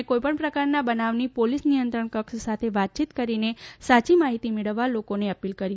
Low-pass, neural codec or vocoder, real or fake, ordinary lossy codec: none; none; real; none